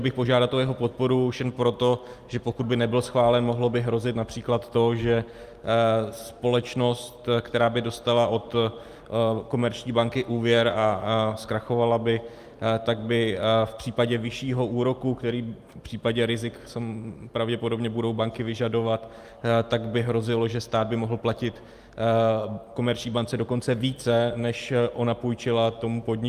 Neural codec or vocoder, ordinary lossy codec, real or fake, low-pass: none; Opus, 32 kbps; real; 14.4 kHz